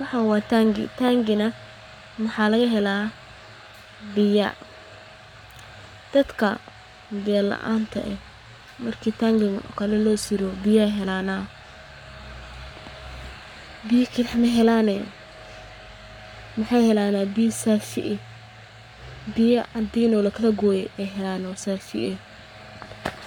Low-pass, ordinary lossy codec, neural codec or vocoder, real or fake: 19.8 kHz; none; codec, 44.1 kHz, 7.8 kbps, Pupu-Codec; fake